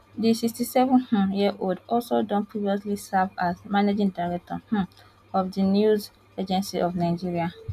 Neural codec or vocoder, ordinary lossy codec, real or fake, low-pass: none; none; real; 14.4 kHz